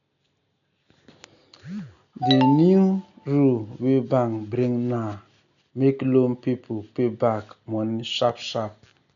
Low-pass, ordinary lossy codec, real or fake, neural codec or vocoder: 7.2 kHz; none; real; none